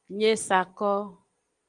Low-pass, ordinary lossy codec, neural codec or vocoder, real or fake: 9.9 kHz; Opus, 24 kbps; none; real